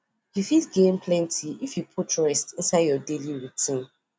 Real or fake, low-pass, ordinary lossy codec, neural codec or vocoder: real; none; none; none